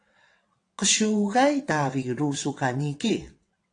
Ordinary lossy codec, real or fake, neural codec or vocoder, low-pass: AAC, 48 kbps; fake; vocoder, 22.05 kHz, 80 mel bands, WaveNeXt; 9.9 kHz